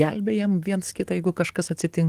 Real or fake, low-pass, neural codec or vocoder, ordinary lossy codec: fake; 14.4 kHz; codec, 44.1 kHz, 7.8 kbps, DAC; Opus, 24 kbps